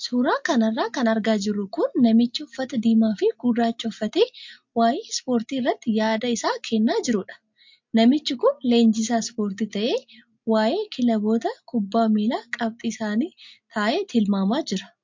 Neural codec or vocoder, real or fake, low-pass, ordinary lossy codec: none; real; 7.2 kHz; MP3, 48 kbps